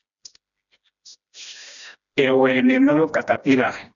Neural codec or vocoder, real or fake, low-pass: codec, 16 kHz, 1 kbps, FreqCodec, smaller model; fake; 7.2 kHz